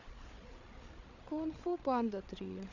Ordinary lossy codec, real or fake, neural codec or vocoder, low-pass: none; fake; codec, 16 kHz, 8 kbps, FreqCodec, larger model; 7.2 kHz